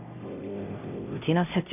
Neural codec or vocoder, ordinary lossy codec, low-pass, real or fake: codec, 16 kHz, 0.5 kbps, X-Codec, HuBERT features, trained on LibriSpeech; none; 3.6 kHz; fake